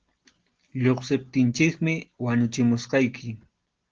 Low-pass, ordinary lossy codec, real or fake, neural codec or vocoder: 7.2 kHz; Opus, 16 kbps; real; none